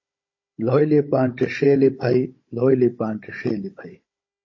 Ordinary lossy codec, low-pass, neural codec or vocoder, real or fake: MP3, 32 kbps; 7.2 kHz; codec, 16 kHz, 16 kbps, FunCodec, trained on Chinese and English, 50 frames a second; fake